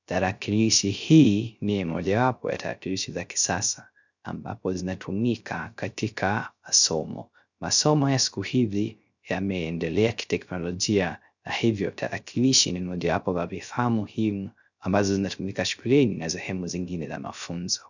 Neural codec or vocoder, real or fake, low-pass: codec, 16 kHz, 0.3 kbps, FocalCodec; fake; 7.2 kHz